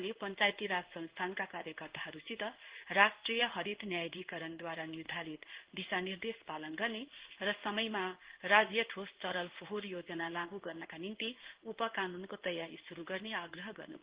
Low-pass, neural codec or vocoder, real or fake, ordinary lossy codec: 3.6 kHz; codec, 16 kHz in and 24 kHz out, 1 kbps, XY-Tokenizer; fake; Opus, 16 kbps